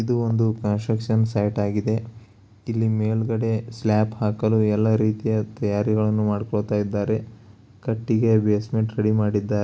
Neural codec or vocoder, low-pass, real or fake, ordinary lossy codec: none; none; real; none